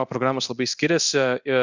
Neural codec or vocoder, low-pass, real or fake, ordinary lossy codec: codec, 24 kHz, 0.9 kbps, DualCodec; 7.2 kHz; fake; Opus, 64 kbps